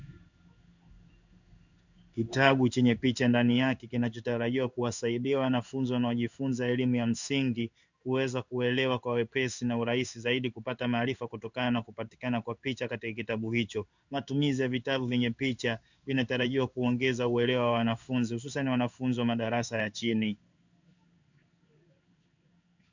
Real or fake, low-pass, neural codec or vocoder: fake; 7.2 kHz; codec, 16 kHz in and 24 kHz out, 1 kbps, XY-Tokenizer